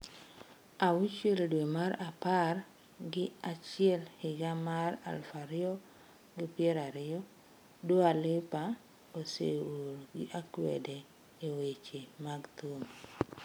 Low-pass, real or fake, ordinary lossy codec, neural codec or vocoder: none; real; none; none